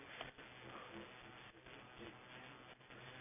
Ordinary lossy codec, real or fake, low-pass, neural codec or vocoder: none; real; 3.6 kHz; none